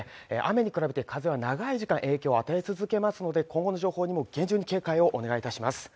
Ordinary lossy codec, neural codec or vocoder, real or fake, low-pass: none; none; real; none